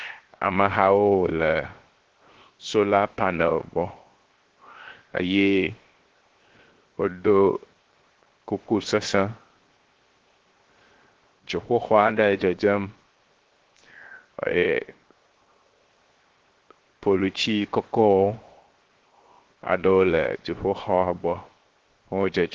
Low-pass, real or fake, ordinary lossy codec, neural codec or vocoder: 7.2 kHz; fake; Opus, 16 kbps; codec, 16 kHz, 0.7 kbps, FocalCodec